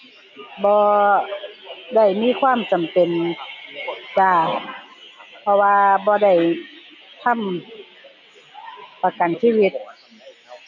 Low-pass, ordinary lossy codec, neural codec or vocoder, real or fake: 7.2 kHz; none; none; real